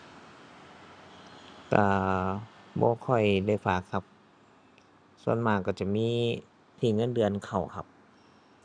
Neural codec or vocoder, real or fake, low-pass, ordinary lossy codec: none; real; 9.9 kHz; none